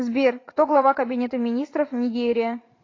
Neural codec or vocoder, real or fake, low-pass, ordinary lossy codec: vocoder, 44.1 kHz, 80 mel bands, Vocos; fake; 7.2 kHz; AAC, 32 kbps